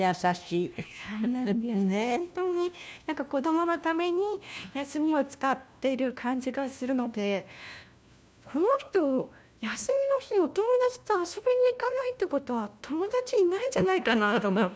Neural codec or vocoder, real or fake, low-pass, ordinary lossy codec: codec, 16 kHz, 1 kbps, FunCodec, trained on LibriTTS, 50 frames a second; fake; none; none